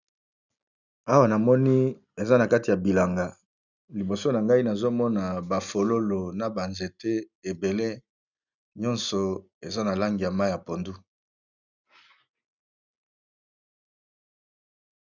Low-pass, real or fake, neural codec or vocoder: 7.2 kHz; real; none